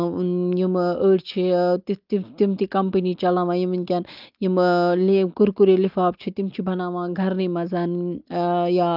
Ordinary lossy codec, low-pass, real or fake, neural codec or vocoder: Opus, 32 kbps; 5.4 kHz; real; none